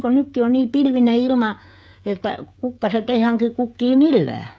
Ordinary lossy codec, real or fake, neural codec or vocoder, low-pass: none; fake; codec, 16 kHz, 16 kbps, FreqCodec, smaller model; none